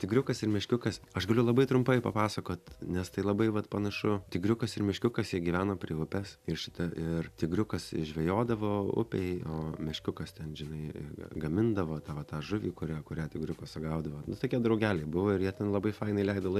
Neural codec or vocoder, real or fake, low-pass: none; real; 14.4 kHz